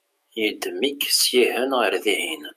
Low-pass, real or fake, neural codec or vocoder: 14.4 kHz; fake; autoencoder, 48 kHz, 128 numbers a frame, DAC-VAE, trained on Japanese speech